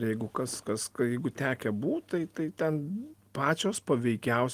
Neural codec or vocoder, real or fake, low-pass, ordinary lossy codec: none; real; 14.4 kHz; Opus, 24 kbps